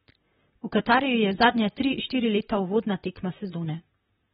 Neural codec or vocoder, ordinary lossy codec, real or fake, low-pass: vocoder, 44.1 kHz, 128 mel bands, Pupu-Vocoder; AAC, 16 kbps; fake; 19.8 kHz